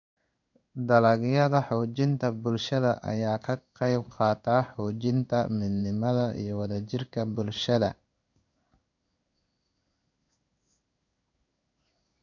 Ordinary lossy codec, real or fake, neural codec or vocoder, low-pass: none; fake; codec, 16 kHz in and 24 kHz out, 1 kbps, XY-Tokenizer; 7.2 kHz